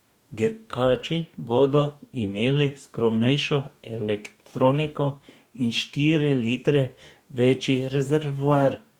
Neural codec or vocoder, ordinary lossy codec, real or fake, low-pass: codec, 44.1 kHz, 2.6 kbps, DAC; Opus, 64 kbps; fake; 19.8 kHz